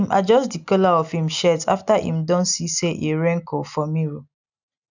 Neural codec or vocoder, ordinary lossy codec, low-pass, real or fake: none; none; 7.2 kHz; real